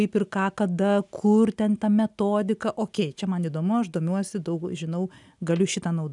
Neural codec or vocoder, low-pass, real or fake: none; 10.8 kHz; real